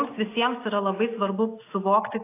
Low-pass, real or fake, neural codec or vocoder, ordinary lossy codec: 3.6 kHz; real; none; AAC, 24 kbps